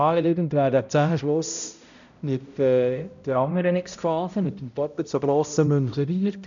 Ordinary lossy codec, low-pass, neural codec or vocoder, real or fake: none; 7.2 kHz; codec, 16 kHz, 0.5 kbps, X-Codec, HuBERT features, trained on balanced general audio; fake